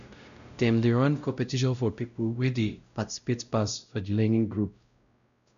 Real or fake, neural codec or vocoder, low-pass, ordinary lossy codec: fake; codec, 16 kHz, 0.5 kbps, X-Codec, WavLM features, trained on Multilingual LibriSpeech; 7.2 kHz; none